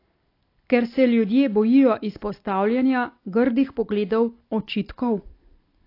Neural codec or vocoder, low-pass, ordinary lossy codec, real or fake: none; 5.4 kHz; AAC, 32 kbps; real